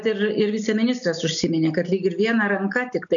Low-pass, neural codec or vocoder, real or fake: 7.2 kHz; none; real